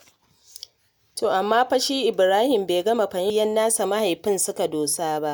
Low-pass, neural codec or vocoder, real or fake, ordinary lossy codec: none; none; real; none